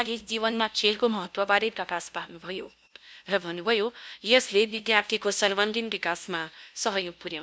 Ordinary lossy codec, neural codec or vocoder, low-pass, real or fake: none; codec, 16 kHz, 0.5 kbps, FunCodec, trained on LibriTTS, 25 frames a second; none; fake